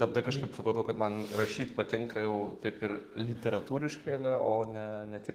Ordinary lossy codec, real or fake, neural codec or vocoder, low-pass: Opus, 24 kbps; fake; codec, 32 kHz, 1.9 kbps, SNAC; 14.4 kHz